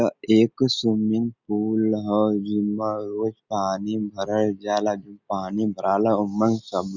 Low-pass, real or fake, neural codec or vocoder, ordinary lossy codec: 7.2 kHz; real; none; Opus, 64 kbps